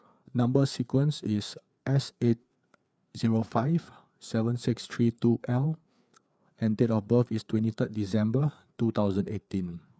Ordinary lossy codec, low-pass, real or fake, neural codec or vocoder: none; none; fake; codec, 16 kHz, 4 kbps, FreqCodec, larger model